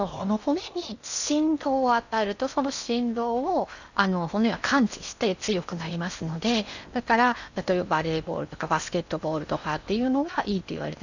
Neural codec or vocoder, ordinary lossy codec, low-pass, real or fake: codec, 16 kHz in and 24 kHz out, 0.8 kbps, FocalCodec, streaming, 65536 codes; none; 7.2 kHz; fake